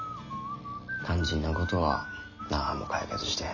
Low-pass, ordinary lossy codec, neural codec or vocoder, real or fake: 7.2 kHz; none; none; real